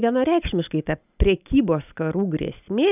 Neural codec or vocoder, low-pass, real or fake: none; 3.6 kHz; real